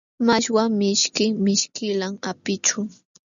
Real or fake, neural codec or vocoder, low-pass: real; none; 7.2 kHz